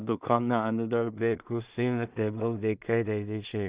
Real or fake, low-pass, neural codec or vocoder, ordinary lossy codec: fake; 3.6 kHz; codec, 16 kHz in and 24 kHz out, 0.4 kbps, LongCat-Audio-Codec, two codebook decoder; Opus, 64 kbps